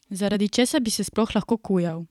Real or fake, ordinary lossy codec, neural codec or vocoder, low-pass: fake; none; vocoder, 44.1 kHz, 128 mel bands every 256 samples, BigVGAN v2; 19.8 kHz